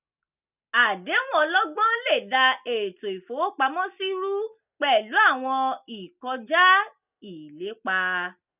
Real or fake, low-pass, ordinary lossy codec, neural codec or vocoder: real; 3.6 kHz; none; none